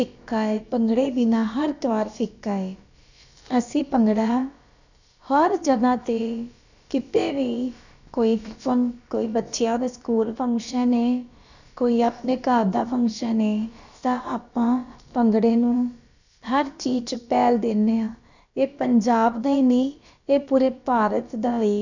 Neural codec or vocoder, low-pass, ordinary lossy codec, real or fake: codec, 16 kHz, about 1 kbps, DyCAST, with the encoder's durations; 7.2 kHz; none; fake